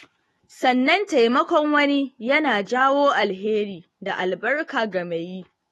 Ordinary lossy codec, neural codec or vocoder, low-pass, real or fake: AAC, 32 kbps; autoencoder, 48 kHz, 128 numbers a frame, DAC-VAE, trained on Japanese speech; 19.8 kHz; fake